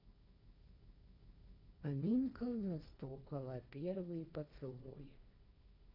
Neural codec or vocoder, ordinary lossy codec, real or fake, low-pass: codec, 16 kHz, 1.1 kbps, Voila-Tokenizer; none; fake; 5.4 kHz